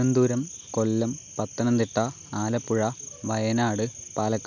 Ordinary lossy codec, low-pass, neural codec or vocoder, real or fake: none; 7.2 kHz; none; real